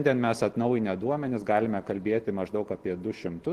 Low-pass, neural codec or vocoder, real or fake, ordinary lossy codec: 14.4 kHz; none; real; Opus, 16 kbps